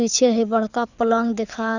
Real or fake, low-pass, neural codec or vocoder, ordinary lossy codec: fake; 7.2 kHz; codec, 24 kHz, 6 kbps, HILCodec; none